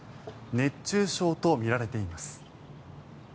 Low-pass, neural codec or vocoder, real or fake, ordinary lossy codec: none; none; real; none